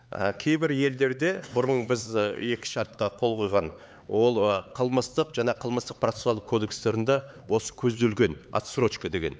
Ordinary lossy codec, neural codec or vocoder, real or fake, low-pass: none; codec, 16 kHz, 4 kbps, X-Codec, HuBERT features, trained on LibriSpeech; fake; none